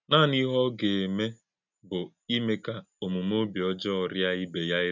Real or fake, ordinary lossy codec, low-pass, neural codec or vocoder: real; none; 7.2 kHz; none